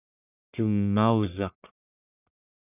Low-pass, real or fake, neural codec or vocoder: 3.6 kHz; fake; codec, 44.1 kHz, 1.7 kbps, Pupu-Codec